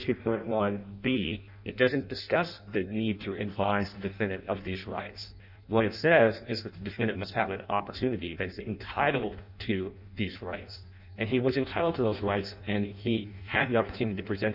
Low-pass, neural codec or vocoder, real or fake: 5.4 kHz; codec, 16 kHz in and 24 kHz out, 0.6 kbps, FireRedTTS-2 codec; fake